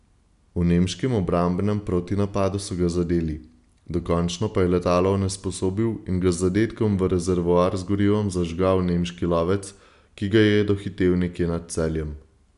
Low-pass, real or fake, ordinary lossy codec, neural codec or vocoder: 10.8 kHz; real; none; none